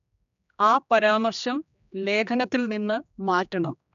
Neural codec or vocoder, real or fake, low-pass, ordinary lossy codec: codec, 16 kHz, 2 kbps, X-Codec, HuBERT features, trained on general audio; fake; 7.2 kHz; none